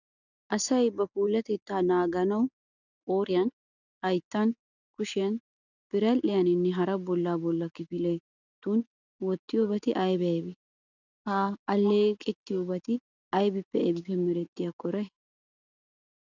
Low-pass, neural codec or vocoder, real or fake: 7.2 kHz; none; real